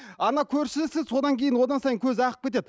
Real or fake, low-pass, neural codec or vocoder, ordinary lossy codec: real; none; none; none